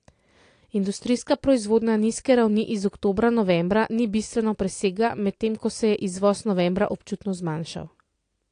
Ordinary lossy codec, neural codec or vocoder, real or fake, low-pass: AAC, 48 kbps; none; real; 9.9 kHz